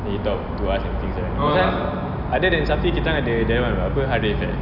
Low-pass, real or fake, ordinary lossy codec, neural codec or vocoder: 5.4 kHz; real; none; none